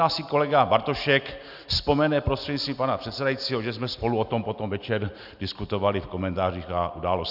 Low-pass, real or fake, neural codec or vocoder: 5.4 kHz; real; none